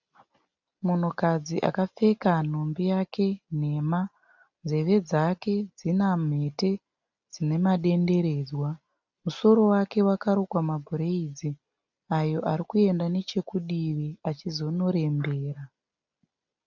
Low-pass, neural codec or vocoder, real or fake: 7.2 kHz; none; real